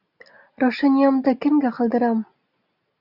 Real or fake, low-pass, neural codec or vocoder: real; 5.4 kHz; none